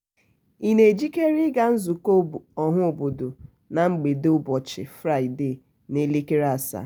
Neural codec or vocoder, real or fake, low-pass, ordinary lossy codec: none; real; none; none